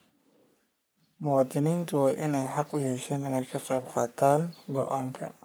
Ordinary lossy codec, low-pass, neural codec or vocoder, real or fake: none; none; codec, 44.1 kHz, 3.4 kbps, Pupu-Codec; fake